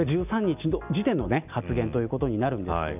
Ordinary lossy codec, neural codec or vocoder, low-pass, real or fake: none; none; 3.6 kHz; real